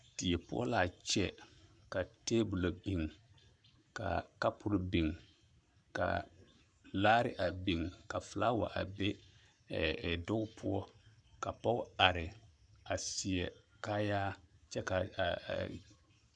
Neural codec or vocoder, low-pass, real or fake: codec, 44.1 kHz, 7.8 kbps, Pupu-Codec; 9.9 kHz; fake